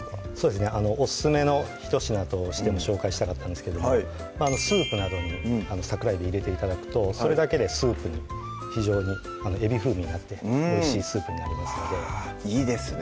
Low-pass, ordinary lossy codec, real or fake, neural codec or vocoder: none; none; real; none